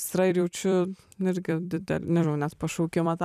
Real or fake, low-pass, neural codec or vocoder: fake; 14.4 kHz; vocoder, 44.1 kHz, 128 mel bands every 256 samples, BigVGAN v2